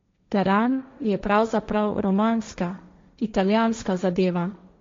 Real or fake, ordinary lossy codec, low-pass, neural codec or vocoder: fake; MP3, 48 kbps; 7.2 kHz; codec, 16 kHz, 1.1 kbps, Voila-Tokenizer